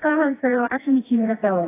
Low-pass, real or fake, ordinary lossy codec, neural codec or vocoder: 3.6 kHz; fake; AAC, 16 kbps; codec, 16 kHz, 1 kbps, FreqCodec, smaller model